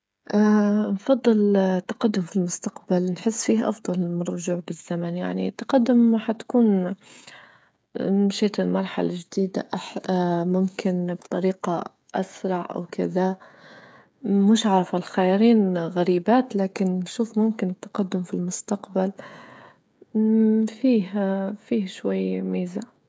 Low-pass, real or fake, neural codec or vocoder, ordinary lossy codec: none; fake; codec, 16 kHz, 16 kbps, FreqCodec, smaller model; none